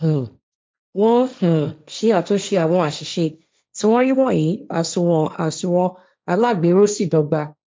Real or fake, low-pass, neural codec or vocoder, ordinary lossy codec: fake; none; codec, 16 kHz, 1.1 kbps, Voila-Tokenizer; none